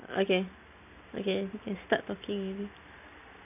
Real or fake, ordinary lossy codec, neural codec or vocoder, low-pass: real; none; none; 3.6 kHz